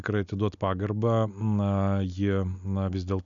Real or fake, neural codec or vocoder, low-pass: real; none; 7.2 kHz